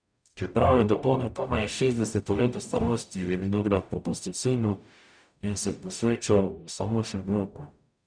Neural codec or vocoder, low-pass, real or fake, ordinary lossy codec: codec, 44.1 kHz, 0.9 kbps, DAC; 9.9 kHz; fake; none